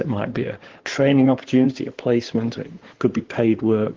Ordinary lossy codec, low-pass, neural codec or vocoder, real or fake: Opus, 16 kbps; 7.2 kHz; codec, 16 kHz in and 24 kHz out, 2.2 kbps, FireRedTTS-2 codec; fake